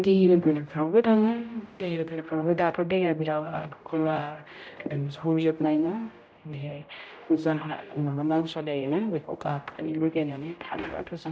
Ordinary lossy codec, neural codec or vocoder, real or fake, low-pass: none; codec, 16 kHz, 0.5 kbps, X-Codec, HuBERT features, trained on general audio; fake; none